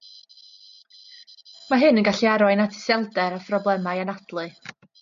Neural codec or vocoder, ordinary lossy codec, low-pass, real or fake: none; MP3, 48 kbps; 7.2 kHz; real